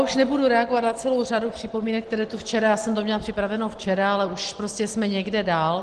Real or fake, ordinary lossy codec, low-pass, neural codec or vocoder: real; Opus, 16 kbps; 9.9 kHz; none